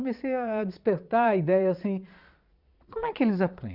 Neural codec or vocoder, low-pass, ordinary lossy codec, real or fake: vocoder, 22.05 kHz, 80 mel bands, Vocos; 5.4 kHz; Opus, 64 kbps; fake